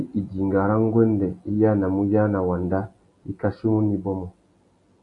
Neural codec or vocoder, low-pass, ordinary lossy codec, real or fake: none; 10.8 kHz; AAC, 48 kbps; real